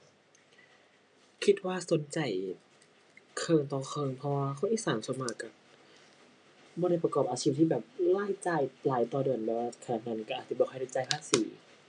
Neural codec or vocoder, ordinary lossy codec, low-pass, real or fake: none; none; 9.9 kHz; real